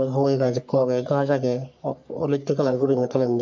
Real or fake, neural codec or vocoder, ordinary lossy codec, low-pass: fake; codec, 44.1 kHz, 3.4 kbps, Pupu-Codec; MP3, 64 kbps; 7.2 kHz